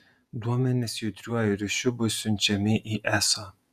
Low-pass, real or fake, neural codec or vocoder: 14.4 kHz; fake; vocoder, 48 kHz, 128 mel bands, Vocos